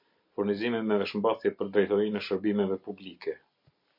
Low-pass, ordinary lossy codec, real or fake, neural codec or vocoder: 5.4 kHz; MP3, 32 kbps; real; none